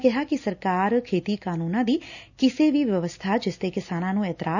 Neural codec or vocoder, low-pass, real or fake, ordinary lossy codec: none; 7.2 kHz; real; none